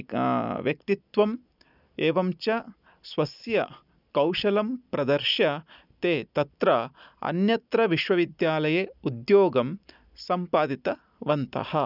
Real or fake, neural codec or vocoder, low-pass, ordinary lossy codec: real; none; 5.4 kHz; none